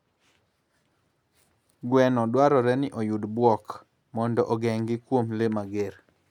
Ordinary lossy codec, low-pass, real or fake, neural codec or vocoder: none; 19.8 kHz; real; none